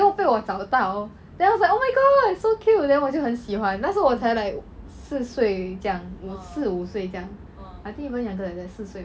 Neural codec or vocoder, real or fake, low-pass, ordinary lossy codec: none; real; none; none